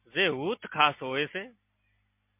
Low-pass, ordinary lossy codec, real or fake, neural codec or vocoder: 3.6 kHz; MP3, 32 kbps; real; none